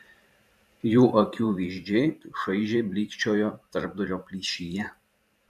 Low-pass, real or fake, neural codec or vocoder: 14.4 kHz; fake; vocoder, 44.1 kHz, 128 mel bands every 512 samples, BigVGAN v2